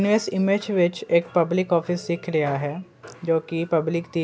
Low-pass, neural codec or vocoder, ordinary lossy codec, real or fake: none; none; none; real